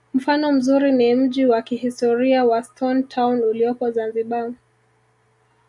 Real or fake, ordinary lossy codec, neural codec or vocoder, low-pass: real; Opus, 64 kbps; none; 10.8 kHz